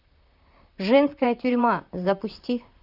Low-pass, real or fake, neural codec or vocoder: 5.4 kHz; fake; vocoder, 44.1 kHz, 128 mel bands, Pupu-Vocoder